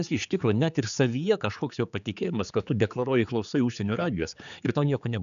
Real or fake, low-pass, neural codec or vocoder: fake; 7.2 kHz; codec, 16 kHz, 4 kbps, X-Codec, HuBERT features, trained on general audio